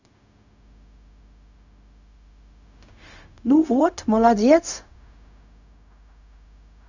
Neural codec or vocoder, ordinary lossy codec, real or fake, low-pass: codec, 16 kHz, 0.4 kbps, LongCat-Audio-Codec; none; fake; 7.2 kHz